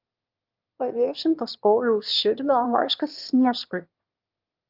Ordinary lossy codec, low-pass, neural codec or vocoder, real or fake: Opus, 24 kbps; 5.4 kHz; autoencoder, 22.05 kHz, a latent of 192 numbers a frame, VITS, trained on one speaker; fake